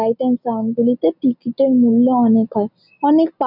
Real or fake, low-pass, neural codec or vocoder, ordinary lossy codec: real; 5.4 kHz; none; none